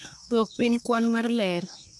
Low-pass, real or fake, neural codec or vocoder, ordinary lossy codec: none; fake; codec, 24 kHz, 1 kbps, SNAC; none